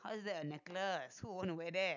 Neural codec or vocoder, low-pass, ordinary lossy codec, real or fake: none; 7.2 kHz; none; real